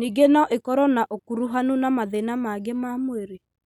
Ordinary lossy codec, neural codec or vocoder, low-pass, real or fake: none; none; 19.8 kHz; real